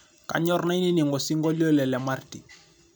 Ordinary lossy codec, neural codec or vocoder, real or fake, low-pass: none; vocoder, 44.1 kHz, 128 mel bands every 256 samples, BigVGAN v2; fake; none